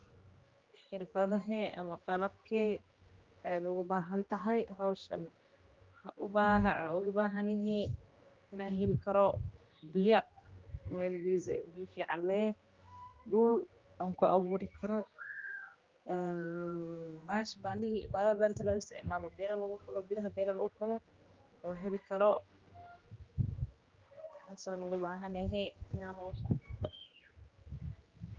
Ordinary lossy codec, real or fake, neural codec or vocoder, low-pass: Opus, 32 kbps; fake; codec, 16 kHz, 1 kbps, X-Codec, HuBERT features, trained on general audio; 7.2 kHz